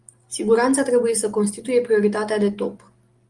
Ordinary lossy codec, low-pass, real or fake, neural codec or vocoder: Opus, 24 kbps; 10.8 kHz; real; none